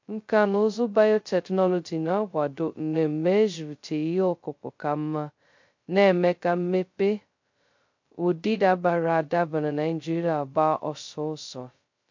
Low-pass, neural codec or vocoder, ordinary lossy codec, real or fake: 7.2 kHz; codec, 16 kHz, 0.2 kbps, FocalCodec; MP3, 48 kbps; fake